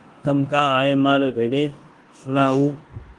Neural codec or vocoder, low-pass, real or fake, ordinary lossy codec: codec, 16 kHz in and 24 kHz out, 0.9 kbps, LongCat-Audio-Codec, four codebook decoder; 10.8 kHz; fake; Opus, 24 kbps